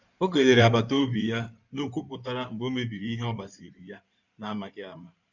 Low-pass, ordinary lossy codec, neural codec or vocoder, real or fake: 7.2 kHz; none; codec, 16 kHz in and 24 kHz out, 2.2 kbps, FireRedTTS-2 codec; fake